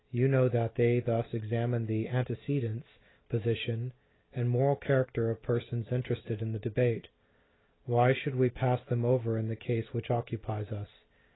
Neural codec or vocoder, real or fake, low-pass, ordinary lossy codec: none; real; 7.2 kHz; AAC, 16 kbps